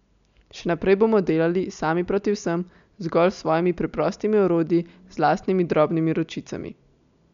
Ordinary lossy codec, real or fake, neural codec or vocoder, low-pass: none; real; none; 7.2 kHz